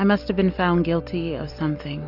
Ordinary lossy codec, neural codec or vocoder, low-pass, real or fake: AAC, 48 kbps; none; 5.4 kHz; real